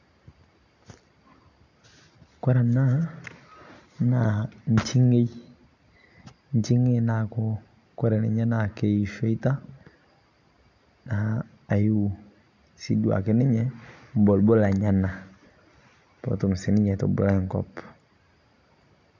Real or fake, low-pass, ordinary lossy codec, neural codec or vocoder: real; 7.2 kHz; none; none